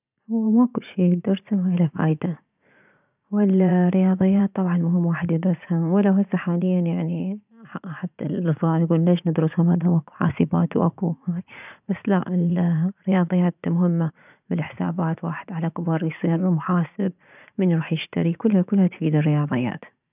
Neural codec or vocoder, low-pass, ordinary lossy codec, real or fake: vocoder, 44.1 kHz, 80 mel bands, Vocos; 3.6 kHz; none; fake